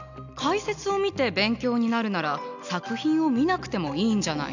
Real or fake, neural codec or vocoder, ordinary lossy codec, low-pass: real; none; none; 7.2 kHz